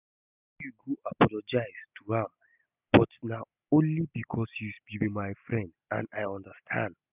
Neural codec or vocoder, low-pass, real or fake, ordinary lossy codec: none; 3.6 kHz; real; none